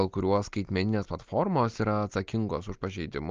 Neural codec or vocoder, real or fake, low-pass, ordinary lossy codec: none; real; 7.2 kHz; Opus, 24 kbps